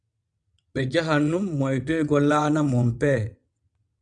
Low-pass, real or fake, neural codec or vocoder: 9.9 kHz; fake; vocoder, 22.05 kHz, 80 mel bands, WaveNeXt